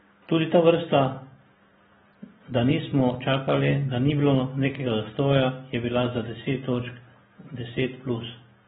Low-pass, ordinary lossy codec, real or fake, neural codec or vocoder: 19.8 kHz; AAC, 16 kbps; real; none